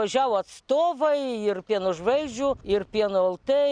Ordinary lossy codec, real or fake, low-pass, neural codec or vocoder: Opus, 64 kbps; real; 9.9 kHz; none